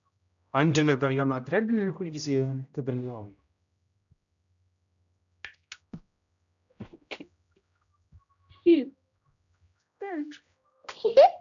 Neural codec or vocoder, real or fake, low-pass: codec, 16 kHz, 0.5 kbps, X-Codec, HuBERT features, trained on general audio; fake; 7.2 kHz